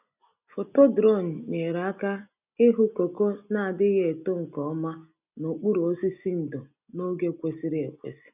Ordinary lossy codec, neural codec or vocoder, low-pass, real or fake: none; none; 3.6 kHz; real